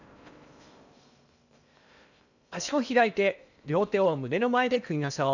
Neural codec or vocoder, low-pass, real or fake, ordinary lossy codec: codec, 16 kHz in and 24 kHz out, 0.8 kbps, FocalCodec, streaming, 65536 codes; 7.2 kHz; fake; none